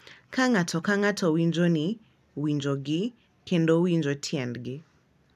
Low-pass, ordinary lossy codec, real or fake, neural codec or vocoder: 14.4 kHz; none; real; none